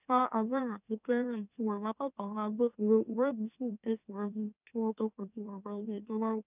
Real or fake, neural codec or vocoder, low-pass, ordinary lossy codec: fake; autoencoder, 44.1 kHz, a latent of 192 numbers a frame, MeloTTS; 3.6 kHz; none